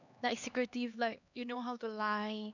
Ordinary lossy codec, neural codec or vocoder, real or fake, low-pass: none; codec, 16 kHz, 2 kbps, X-Codec, HuBERT features, trained on LibriSpeech; fake; 7.2 kHz